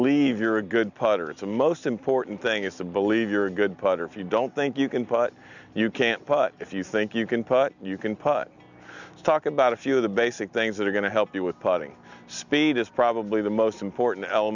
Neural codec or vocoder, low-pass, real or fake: none; 7.2 kHz; real